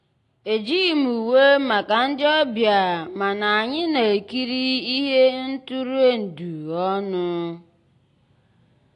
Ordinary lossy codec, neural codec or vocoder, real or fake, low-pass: AAC, 48 kbps; none; real; 10.8 kHz